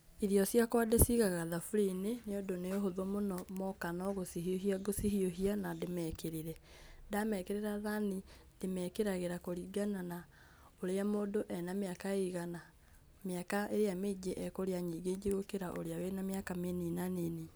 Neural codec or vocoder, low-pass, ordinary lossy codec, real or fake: none; none; none; real